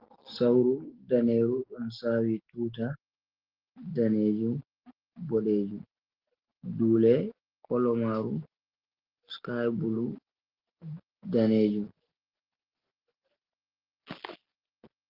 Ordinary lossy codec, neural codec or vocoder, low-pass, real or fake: Opus, 16 kbps; none; 5.4 kHz; real